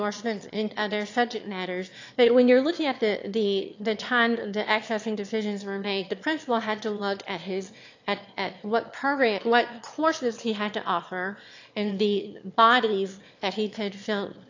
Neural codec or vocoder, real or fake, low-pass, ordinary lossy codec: autoencoder, 22.05 kHz, a latent of 192 numbers a frame, VITS, trained on one speaker; fake; 7.2 kHz; AAC, 48 kbps